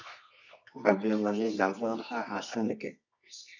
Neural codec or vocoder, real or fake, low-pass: codec, 24 kHz, 0.9 kbps, WavTokenizer, medium music audio release; fake; 7.2 kHz